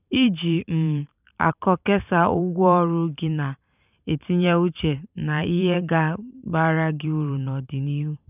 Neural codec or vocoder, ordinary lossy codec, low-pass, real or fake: vocoder, 44.1 kHz, 128 mel bands every 512 samples, BigVGAN v2; none; 3.6 kHz; fake